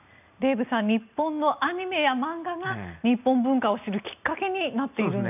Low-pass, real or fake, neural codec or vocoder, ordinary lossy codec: 3.6 kHz; real; none; none